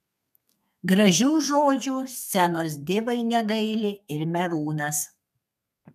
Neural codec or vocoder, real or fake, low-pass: codec, 32 kHz, 1.9 kbps, SNAC; fake; 14.4 kHz